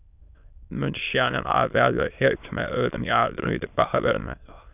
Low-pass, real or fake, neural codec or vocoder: 3.6 kHz; fake; autoencoder, 22.05 kHz, a latent of 192 numbers a frame, VITS, trained on many speakers